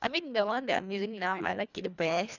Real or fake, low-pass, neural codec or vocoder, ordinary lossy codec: fake; 7.2 kHz; codec, 24 kHz, 1.5 kbps, HILCodec; none